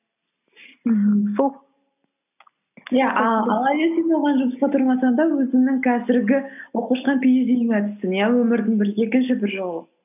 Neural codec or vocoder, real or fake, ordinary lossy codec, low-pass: none; real; none; 3.6 kHz